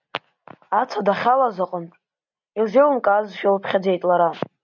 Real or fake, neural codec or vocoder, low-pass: real; none; 7.2 kHz